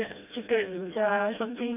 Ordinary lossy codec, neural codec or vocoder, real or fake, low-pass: none; codec, 16 kHz, 1 kbps, FreqCodec, smaller model; fake; 3.6 kHz